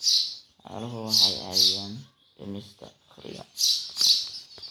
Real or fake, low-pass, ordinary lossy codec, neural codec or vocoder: real; none; none; none